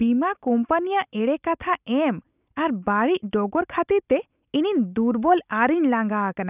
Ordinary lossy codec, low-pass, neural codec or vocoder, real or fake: none; 3.6 kHz; none; real